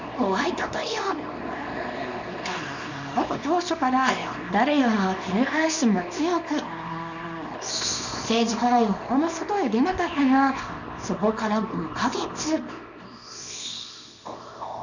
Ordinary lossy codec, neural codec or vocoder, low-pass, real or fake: none; codec, 24 kHz, 0.9 kbps, WavTokenizer, small release; 7.2 kHz; fake